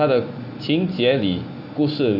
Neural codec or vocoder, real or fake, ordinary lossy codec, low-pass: none; real; none; 5.4 kHz